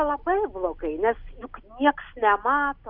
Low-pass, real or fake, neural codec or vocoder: 5.4 kHz; real; none